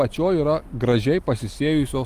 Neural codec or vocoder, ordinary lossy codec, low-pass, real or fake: none; Opus, 24 kbps; 14.4 kHz; real